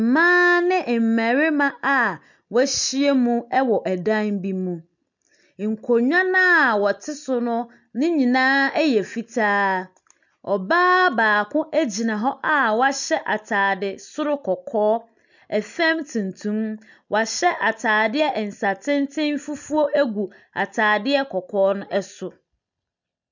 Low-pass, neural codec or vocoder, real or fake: 7.2 kHz; none; real